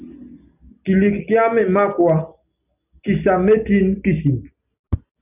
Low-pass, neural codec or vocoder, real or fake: 3.6 kHz; none; real